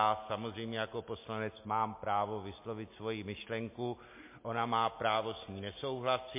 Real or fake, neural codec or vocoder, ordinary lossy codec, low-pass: real; none; MP3, 24 kbps; 3.6 kHz